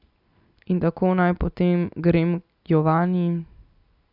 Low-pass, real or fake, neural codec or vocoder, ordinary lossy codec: 5.4 kHz; real; none; none